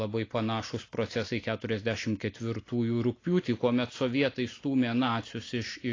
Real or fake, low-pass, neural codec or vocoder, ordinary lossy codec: real; 7.2 kHz; none; AAC, 32 kbps